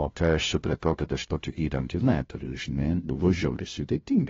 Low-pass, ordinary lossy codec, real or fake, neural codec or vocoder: 7.2 kHz; AAC, 24 kbps; fake; codec, 16 kHz, 0.5 kbps, FunCodec, trained on LibriTTS, 25 frames a second